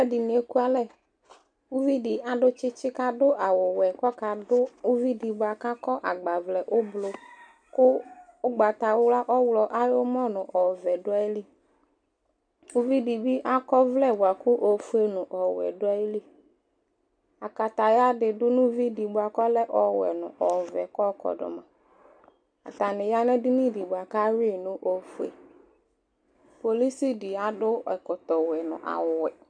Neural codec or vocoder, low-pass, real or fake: none; 9.9 kHz; real